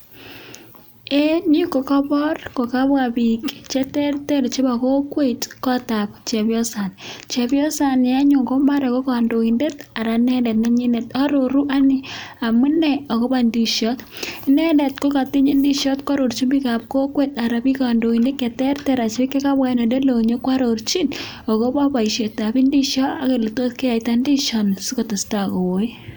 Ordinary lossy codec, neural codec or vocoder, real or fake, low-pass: none; none; real; none